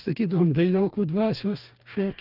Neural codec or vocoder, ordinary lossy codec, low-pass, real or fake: codec, 44.1 kHz, 2.6 kbps, DAC; Opus, 32 kbps; 5.4 kHz; fake